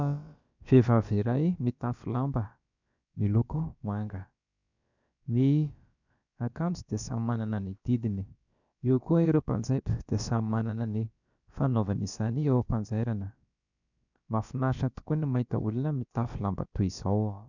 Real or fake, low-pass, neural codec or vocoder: fake; 7.2 kHz; codec, 16 kHz, about 1 kbps, DyCAST, with the encoder's durations